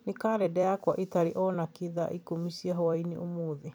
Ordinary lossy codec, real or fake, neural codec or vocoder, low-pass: none; fake; vocoder, 44.1 kHz, 128 mel bands every 256 samples, BigVGAN v2; none